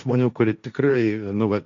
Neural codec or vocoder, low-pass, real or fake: codec, 16 kHz, 1.1 kbps, Voila-Tokenizer; 7.2 kHz; fake